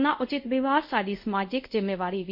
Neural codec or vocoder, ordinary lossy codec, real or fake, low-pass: codec, 24 kHz, 0.5 kbps, DualCodec; MP3, 32 kbps; fake; 5.4 kHz